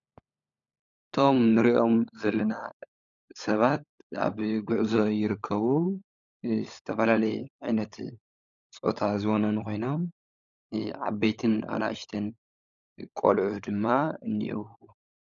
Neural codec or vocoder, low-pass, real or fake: codec, 16 kHz, 16 kbps, FunCodec, trained on LibriTTS, 50 frames a second; 7.2 kHz; fake